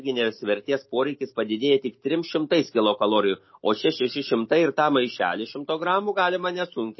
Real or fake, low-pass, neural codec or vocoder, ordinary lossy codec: real; 7.2 kHz; none; MP3, 24 kbps